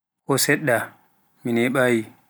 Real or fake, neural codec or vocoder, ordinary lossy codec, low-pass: real; none; none; none